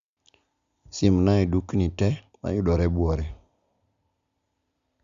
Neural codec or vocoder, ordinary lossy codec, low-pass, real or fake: none; Opus, 64 kbps; 7.2 kHz; real